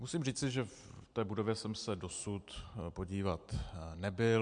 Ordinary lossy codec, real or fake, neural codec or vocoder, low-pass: MP3, 64 kbps; real; none; 9.9 kHz